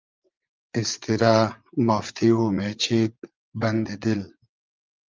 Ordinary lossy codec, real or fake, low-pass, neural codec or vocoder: Opus, 24 kbps; fake; 7.2 kHz; vocoder, 44.1 kHz, 80 mel bands, Vocos